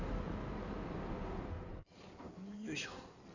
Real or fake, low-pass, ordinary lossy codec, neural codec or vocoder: fake; 7.2 kHz; none; codec, 16 kHz in and 24 kHz out, 2.2 kbps, FireRedTTS-2 codec